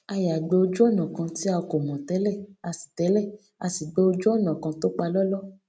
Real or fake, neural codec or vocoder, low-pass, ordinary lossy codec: real; none; none; none